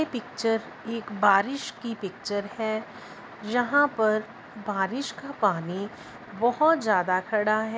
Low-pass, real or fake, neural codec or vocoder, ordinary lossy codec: none; real; none; none